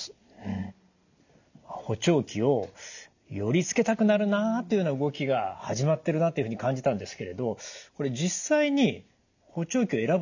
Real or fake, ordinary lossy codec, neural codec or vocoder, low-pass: real; none; none; 7.2 kHz